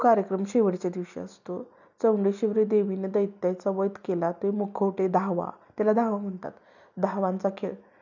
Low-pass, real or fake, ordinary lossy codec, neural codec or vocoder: 7.2 kHz; real; none; none